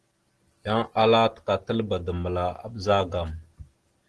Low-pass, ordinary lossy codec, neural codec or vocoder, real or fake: 10.8 kHz; Opus, 16 kbps; none; real